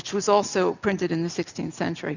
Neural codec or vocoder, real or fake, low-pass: none; real; 7.2 kHz